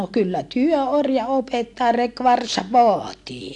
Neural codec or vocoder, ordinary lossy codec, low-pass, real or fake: none; AAC, 64 kbps; 10.8 kHz; real